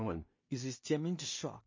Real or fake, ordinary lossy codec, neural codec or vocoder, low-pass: fake; MP3, 32 kbps; codec, 16 kHz in and 24 kHz out, 0.4 kbps, LongCat-Audio-Codec, two codebook decoder; 7.2 kHz